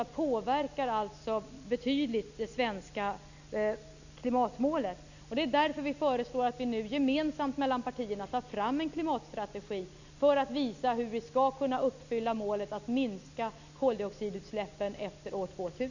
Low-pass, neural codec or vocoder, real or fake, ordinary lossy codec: 7.2 kHz; none; real; none